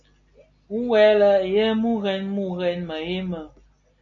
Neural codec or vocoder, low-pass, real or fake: none; 7.2 kHz; real